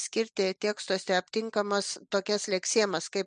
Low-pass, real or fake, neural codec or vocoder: 9.9 kHz; real; none